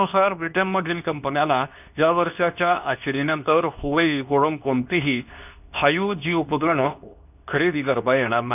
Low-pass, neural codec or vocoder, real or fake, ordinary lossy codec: 3.6 kHz; codec, 24 kHz, 0.9 kbps, WavTokenizer, medium speech release version 2; fake; none